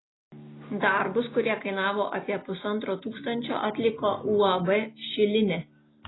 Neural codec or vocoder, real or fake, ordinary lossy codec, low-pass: none; real; AAC, 16 kbps; 7.2 kHz